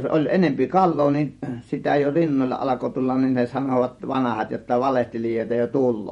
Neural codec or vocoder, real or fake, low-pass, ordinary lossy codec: vocoder, 48 kHz, 128 mel bands, Vocos; fake; 19.8 kHz; MP3, 48 kbps